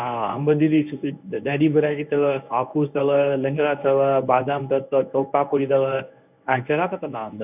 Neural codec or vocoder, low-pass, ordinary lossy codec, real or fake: codec, 24 kHz, 0.9 kbps, WavTokenizer, medium speech release version 1; 3.6 kHz; none; fake